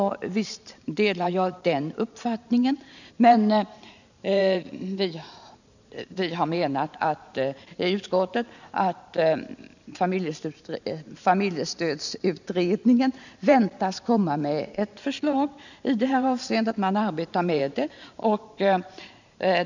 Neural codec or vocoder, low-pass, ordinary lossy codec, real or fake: vocoder, 44.1 kHz, 128 mel bands every 512 samples, BigVGAN v2; 7.2 kHz; none; fake